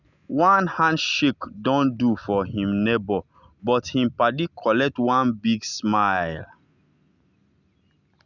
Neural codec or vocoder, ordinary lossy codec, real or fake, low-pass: none; none; real; 7.2 kHz